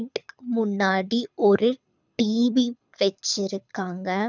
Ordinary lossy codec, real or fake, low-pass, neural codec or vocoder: none; fake; 7.2 kHz; codec, 24 kHz, 6 kbps, HILCodec